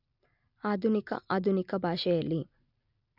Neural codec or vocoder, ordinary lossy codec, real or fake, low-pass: none; none; real; 5.4 kHz